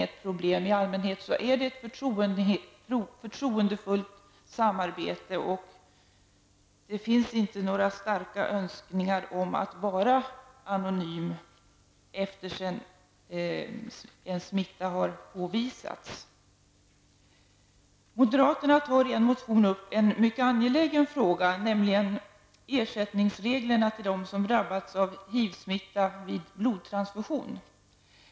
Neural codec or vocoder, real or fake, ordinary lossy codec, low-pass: none; real; none; none